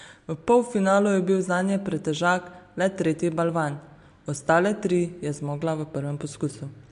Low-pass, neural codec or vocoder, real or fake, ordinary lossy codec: 10.8 kHz; none; real; MP3, 64 kbps